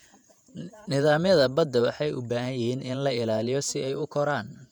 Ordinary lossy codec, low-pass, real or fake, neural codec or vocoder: none; 19.8 kHz; fake; vocoder, 48 kHz, 128 mel bands, Vocos